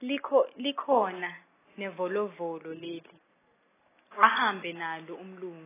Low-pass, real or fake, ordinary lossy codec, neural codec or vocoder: 3.6 kHz; real; AAC, 16 kbps; none